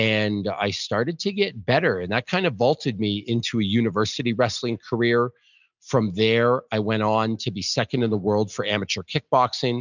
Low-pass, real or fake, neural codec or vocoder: 7.2 kHz; real; none